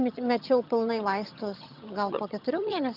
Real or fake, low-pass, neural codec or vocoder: fake; 5.4 kHz; vocoder, 22.05 kHz, 80 mel bands, HiFi-GAN